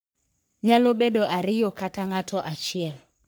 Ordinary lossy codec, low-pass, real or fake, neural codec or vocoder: none; none; fake; codec, 44.1 kHz, 3.4 kbps, Pupu-Codec